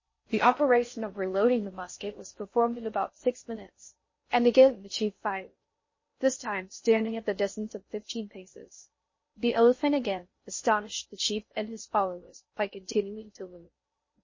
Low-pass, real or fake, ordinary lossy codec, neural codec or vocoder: 7.2 kHz; fake; MP3, 32 kbps; codec, 16 kHz in and 24 kHz out, 0.6 kbps, FocalCodec, streaming, 4096 codes